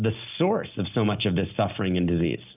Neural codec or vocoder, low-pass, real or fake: none; 3.6 kHz; real